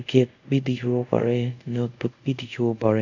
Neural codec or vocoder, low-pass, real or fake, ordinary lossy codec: codec, 24 kHz, 0.5 kbps, DualCodec; 7.2 kHz; fake; none